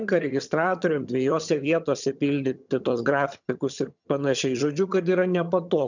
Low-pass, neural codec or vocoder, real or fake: 7.2 kHz; vocoder, 22.05 kHz, 80 mel bands, HiFi-GAN; fake